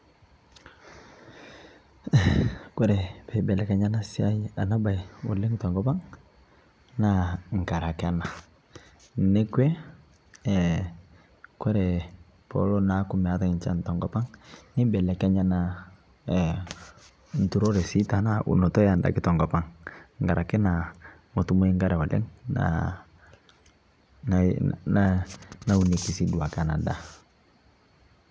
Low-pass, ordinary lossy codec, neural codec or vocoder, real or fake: none; none; none; real